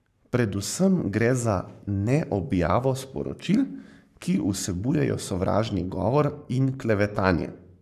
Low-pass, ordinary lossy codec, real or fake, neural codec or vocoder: 14.4 kHz; none; fake; codec, 44.1 kHz, 7.8 kbps, Pupu-Codec